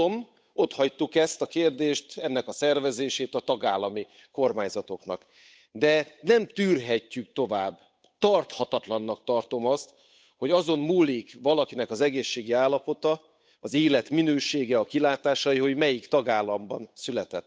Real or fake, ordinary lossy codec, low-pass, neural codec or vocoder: fake; none; none; codec, 16 kHz, 8 kbps, FunCodec, trained on Chinese and English, 25 frames a second